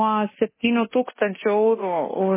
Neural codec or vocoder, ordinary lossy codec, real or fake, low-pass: codec, 24 kHz, 0.9 kbps, DualCodec; MP3, 16 kbps; fake; 3.6 kHz